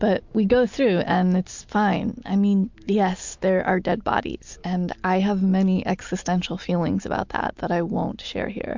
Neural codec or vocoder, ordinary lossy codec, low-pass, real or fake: none; MP3, 64 kbps; 7.2 kHz; real